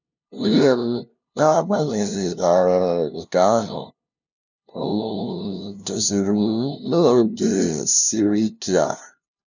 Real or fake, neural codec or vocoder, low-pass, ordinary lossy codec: fake; codec, 16 kHz, 0.5 kbps, FunCodec, trained on LibriTTS, 25 frames a second; 7.2 kHz; none